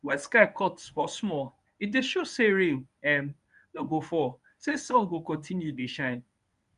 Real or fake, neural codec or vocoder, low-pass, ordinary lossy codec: fake; codec, 24 kHz, 0.9 kbps, WavTokenizer, medium speech release version 1; 10.8 kHz; none